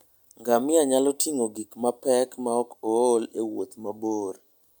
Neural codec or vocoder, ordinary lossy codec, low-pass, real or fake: none; none; none; real